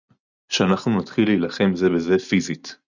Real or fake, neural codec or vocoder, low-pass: real; none; 7.2 kHz